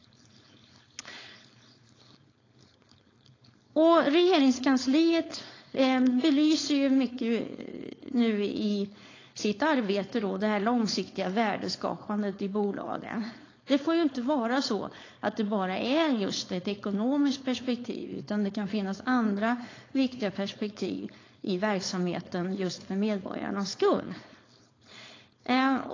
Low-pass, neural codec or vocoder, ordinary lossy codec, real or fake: 7.2 kHz; codec, 16 kHz, 4.8 kbps, FACodec; AAC, 32 kbps; fake